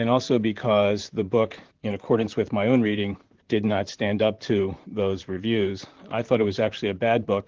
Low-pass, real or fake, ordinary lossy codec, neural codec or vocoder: 7.2 kHz; fake; Opus, 16 kbps; vocoder, 44.1 kHz, 128 mel bands, Pupu-Vocoder